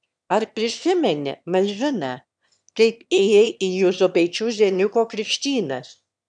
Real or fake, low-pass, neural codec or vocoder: fake; 9.9 kHz; autoencoder, 22.05 kHz, a latent of 192 numbers a frame, VITS, trained on one speaker